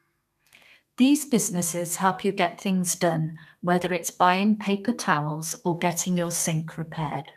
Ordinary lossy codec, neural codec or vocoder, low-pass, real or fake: none; codec, 32 kHz, 1.9 kbps, SNAC; 14.4 kHz; fake